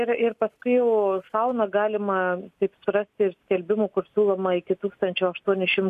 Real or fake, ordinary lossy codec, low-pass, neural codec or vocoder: real; MP3, 64 kbps; 14.4 kHz; none